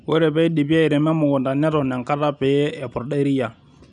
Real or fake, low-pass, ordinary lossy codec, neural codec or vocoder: real; 10.8 kHz; none; none